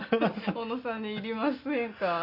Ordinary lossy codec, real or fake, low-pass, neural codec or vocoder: Opus, 64 kbps; real; 5.4 kHz; none